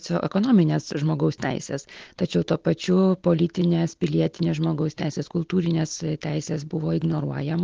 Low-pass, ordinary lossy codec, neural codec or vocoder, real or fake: 7.2 kHz; Opus, 16 kbps; none; real